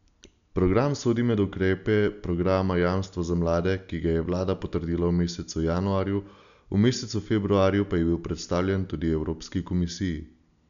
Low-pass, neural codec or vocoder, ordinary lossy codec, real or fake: 7.2 kHz; none; none; real